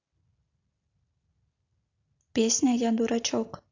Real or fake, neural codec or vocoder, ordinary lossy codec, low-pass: real; none; AAC, 48 kbps; 7.2 kHz